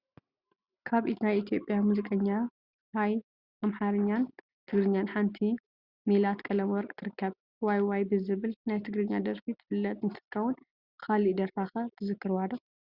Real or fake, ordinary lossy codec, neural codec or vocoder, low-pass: real; Opus, 64 kbps; none; 5.4 kHz